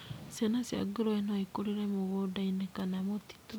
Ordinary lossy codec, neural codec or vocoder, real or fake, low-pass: none; none; real; none